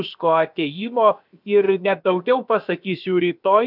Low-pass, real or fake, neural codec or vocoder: 5.4 kHz; fake; codec, 16 kHz, about 1 kbps, DyCAST, with the encoder's durations